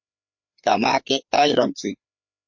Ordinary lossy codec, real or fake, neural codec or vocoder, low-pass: MP3, 48 kbps; fake; codec, 16 kHz, 4 kbps, FreqCodec, larger model; 7.2 kHz